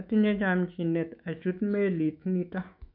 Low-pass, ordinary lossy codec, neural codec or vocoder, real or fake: 5.4 kHz; none; autoencoder, 48 kHz, 128 numbers a frame, DAC-VAE, trained on Japanese speech; fake